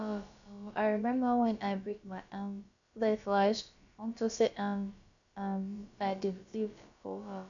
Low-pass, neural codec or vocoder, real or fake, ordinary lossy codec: 7.2 kHz; codec, 16 kHz, about 1 kbps, DyCAST, with the encoder's durations; fake; none